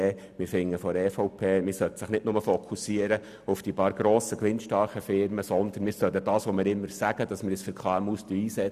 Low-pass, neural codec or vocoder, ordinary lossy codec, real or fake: 14.4 kHz; none; none; real